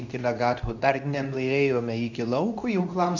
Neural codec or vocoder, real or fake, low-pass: codec, 24 kHz, 0.9 kbps, WavTokenizer, medium speech release version 2; fake; 7.2 kHz